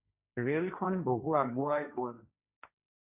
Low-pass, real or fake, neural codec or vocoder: 3.6 kHz; fake; codec, 16 kHz, 1.1 kbps, Voila-Tokenizer